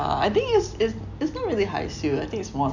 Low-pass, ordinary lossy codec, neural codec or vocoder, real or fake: 7.2 kHz; none; none; real